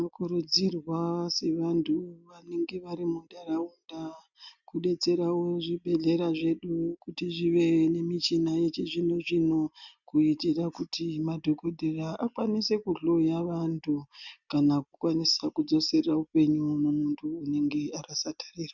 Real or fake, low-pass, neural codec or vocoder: real; 7.2 kHz; none